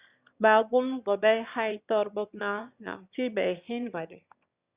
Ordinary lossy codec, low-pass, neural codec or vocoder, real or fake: Opus, 64 kbps; 3.6 kHz; autoencoder, 22.05 kHz, a latent of 192 numbers a frame, VITS, trained on one speaker; fake